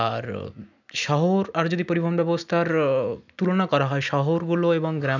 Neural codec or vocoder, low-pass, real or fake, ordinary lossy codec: none; 7.2 kHz; real; none